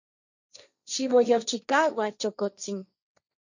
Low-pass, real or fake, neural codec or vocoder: 7.2 kHz; fake; codec, 16 kHz, 1.1 kbps, Voila-Tokenizer